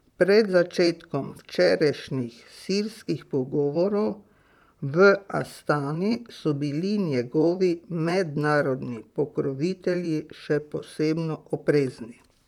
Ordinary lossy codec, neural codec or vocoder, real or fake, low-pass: none; vocoder, 44.1 kHz, 128 mel bands, Pupu-Vocoder; fake; 19.8 kHz